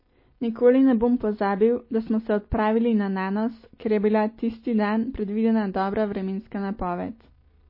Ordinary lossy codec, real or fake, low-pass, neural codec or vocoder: MP3, 24 kbps; real; 5.4 kHz; none